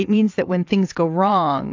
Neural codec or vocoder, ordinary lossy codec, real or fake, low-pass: vocoder, 22.05 kHz, 80 mel bands, WaveNeXt; AAC, 48 kbps; fake; 7.2 kHz